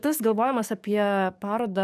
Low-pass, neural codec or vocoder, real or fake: 14.4 kHz; codec, 44.1 kHz, 7.8 kbps, DAC; fake